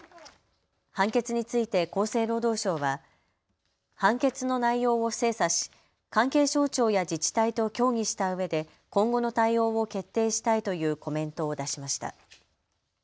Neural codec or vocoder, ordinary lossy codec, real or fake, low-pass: none; none; real; none